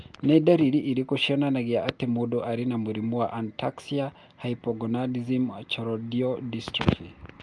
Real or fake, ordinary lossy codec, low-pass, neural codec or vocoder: real; Opus, 32 kbps; 10.8 kHz; none